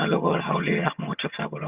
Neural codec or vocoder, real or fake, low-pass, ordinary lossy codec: vocoder, 22.05 kHz, 80 mel bands, HiFi-GAN; fake; 3.6 kHz; Opus, 64 kbps